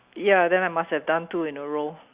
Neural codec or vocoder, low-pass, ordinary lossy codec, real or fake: none; 3.6 kHz; Opus, 64 kbps; real